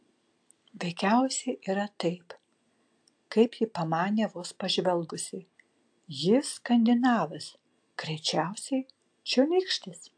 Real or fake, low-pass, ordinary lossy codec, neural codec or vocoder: real; 9.9 kHz; AAC, 64 kbps; none